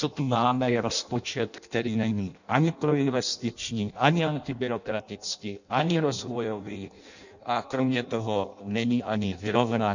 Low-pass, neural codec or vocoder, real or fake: 7.2 kHz; codec, 16 kHz in and 24 kHz out, 0.6 kbps, FireRedTTS-2 codec; fake